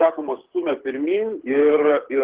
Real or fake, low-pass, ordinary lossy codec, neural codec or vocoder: fake; 3.6 kHz; Opus, 16 kbps; codec, 24 kHz, 6 kbps, HILCodec